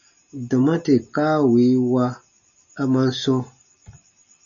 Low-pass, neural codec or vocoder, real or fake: 7.2 kHz; none; real